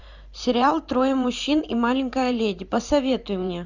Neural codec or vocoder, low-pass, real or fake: vocoder, 44.1 kHz, 128 mel bands every 512 samples, BigVGAN v2; 7.2 kHz; fake